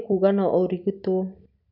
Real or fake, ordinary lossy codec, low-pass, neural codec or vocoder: real; none; 5.4 kHz; none